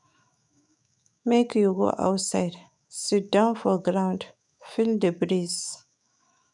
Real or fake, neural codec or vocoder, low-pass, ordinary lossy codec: fake; autoencoder, 48 kHz, 128 numbers a frame, DAC-VAE, trained on Japanese speech; 10.8 kHz; none